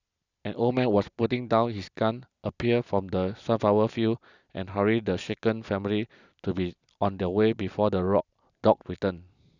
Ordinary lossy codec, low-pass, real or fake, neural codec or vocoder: none; 7.2 kHz; real; none